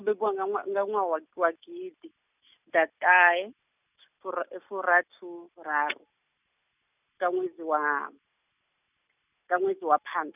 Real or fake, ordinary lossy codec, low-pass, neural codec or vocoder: real; none; 3.6 kHz; none